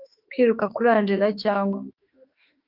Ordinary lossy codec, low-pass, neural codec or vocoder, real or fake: Opus, 24 kbps; 5.4 kHz; autoencoder, 48 kHz, 32 numbers a frame, DAC-VAE, trained on Japanese speech; fake